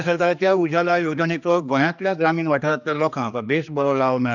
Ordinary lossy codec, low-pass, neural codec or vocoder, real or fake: none; 7.2 kHz; codec, 16 kHz, 2 kbps, X-Codec, HuBERT features, trained on general audio; fake